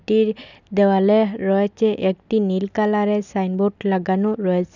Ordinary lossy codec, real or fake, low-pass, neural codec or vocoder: none; real; 7.2 kHz; none